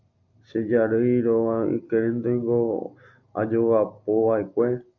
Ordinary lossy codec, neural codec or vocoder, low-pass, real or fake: AAC, 48 kbps; none; 7.2 kHz; real